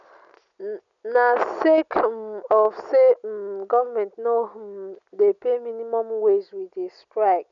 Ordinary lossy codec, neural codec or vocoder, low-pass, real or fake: none; none; 7.2 kHz; real